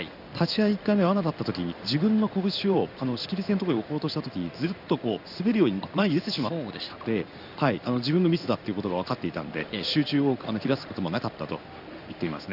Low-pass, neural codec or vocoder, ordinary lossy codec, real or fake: 5.4 kHz; codec, 16 kHz in and 24 kHz out, 1 kbps, XY-Tokenizer; none; fake